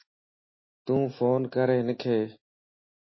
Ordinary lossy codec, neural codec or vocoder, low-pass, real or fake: MP3, 24 kbps; none; 7.2 kHz; real